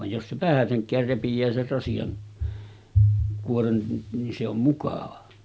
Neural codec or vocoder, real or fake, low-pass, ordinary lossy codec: none; real; none; none